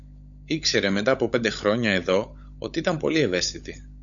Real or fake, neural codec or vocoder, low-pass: fake; codec, 16 kHz, 16 kbps, FunCodec, trained on LibriTTS, 50 frames a second; 7.2 kHz